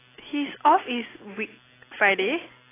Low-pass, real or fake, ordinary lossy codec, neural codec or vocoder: 3.6 kHz; real; AAC, 16 kbps; none